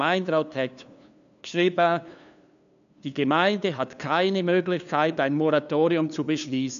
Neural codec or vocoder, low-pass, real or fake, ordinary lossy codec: codec, 16 kHz, 2 kbps, FunCodec, trained on LibriTTS, 25 frames a second; 7.2 kHz; fake; none